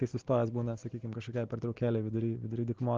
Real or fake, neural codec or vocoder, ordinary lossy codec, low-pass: real; none; Opus, 16 kbps; 7.2 kHz